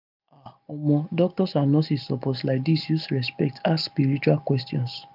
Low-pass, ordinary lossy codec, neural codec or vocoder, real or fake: 5.4 kHz; none; none; real